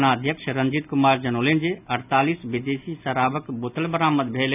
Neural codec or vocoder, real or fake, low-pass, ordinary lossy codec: none; real; 3.6 kHz; none